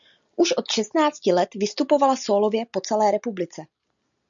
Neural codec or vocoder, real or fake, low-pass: none; real; 7.2 kHz